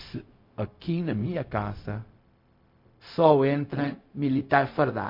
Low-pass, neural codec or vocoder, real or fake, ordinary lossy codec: 5.4 kHz; codec, 16 kHz, 0.4 kbps, LongCat-Audio-Codec; fake; MP3, 32 kbps